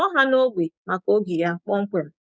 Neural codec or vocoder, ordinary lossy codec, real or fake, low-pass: codec, 16 kHz, 6 kbps, DAC; none; fake; none